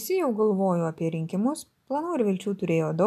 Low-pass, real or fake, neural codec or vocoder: 14.4 kHz; real; none